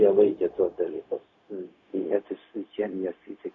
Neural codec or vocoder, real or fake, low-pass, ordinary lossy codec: codec, 16 kHz, 0.4 kbps, LongCat-Audio-Codec; fake; 7.2 kHz; MP3, 48 kbps